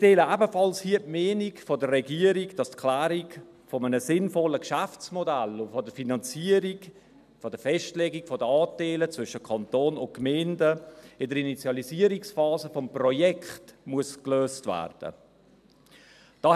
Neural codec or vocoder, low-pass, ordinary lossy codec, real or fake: none; 14.4 kHz; none; real